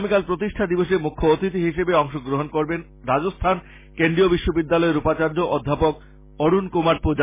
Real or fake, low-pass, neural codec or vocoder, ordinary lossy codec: real; 3.6 kHz; none; MP3, 16 kbps